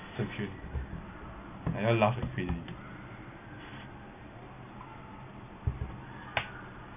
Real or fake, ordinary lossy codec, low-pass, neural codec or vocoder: real; none; 3.6 kHz; none